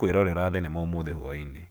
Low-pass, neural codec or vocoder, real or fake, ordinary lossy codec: none; codec, 44.1 kHz, 7.8 kbps, DAC; fake; none